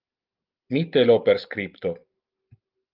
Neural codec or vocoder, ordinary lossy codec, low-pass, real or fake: none; Opus, 32 kbps; 5.4 kHz; real